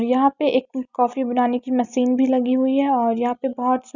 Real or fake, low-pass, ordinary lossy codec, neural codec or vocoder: real; 7.2 kHz; none; none